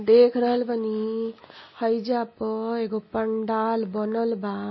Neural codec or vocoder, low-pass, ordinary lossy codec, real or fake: none; 7.2 kHz; MP3, 24 kbps; real